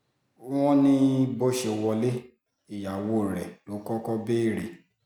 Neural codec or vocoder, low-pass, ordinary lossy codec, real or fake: none; 19.8 kHz; none; real